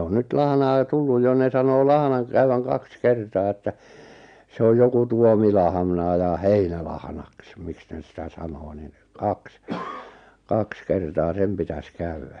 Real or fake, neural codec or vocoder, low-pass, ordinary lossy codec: real; none; 9.9 kHz; MP3, 64 kbps